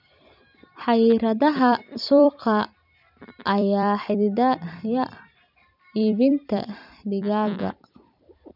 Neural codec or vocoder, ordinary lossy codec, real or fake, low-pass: vocoder, 44.1 kHz, 128 mel bands every 256 samples, BigVGAN v2; none; fake; 5.4 kHz